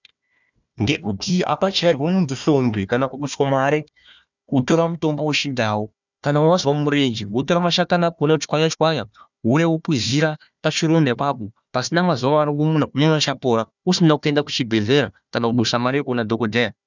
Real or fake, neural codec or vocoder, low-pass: fake; codec, 16 kHz, 1 kbps, FunCodec, trained on Chinese and English, 50 frames a second; 7.2 kHz